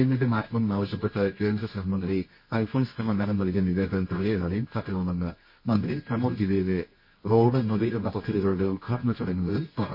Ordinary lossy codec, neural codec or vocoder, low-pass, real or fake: MP3, 24 kbps; codec, 24 kHz, 0.9 kbps, WavTokenizer, medium music audio release; 5.4 kHz; fake